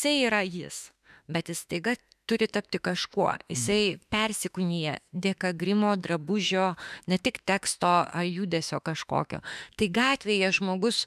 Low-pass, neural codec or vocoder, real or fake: 14.4 kHz; autoencoder, 48 kHz, 32 numbers a frame, DAC-VAE, trained on Japanese speech; fake